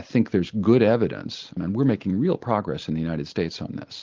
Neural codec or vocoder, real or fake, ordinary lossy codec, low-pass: none; real; Opus, 24 kbps; 7.2 kHz